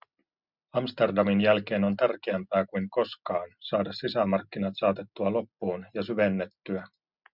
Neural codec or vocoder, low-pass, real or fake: none; 5.4 kHz; real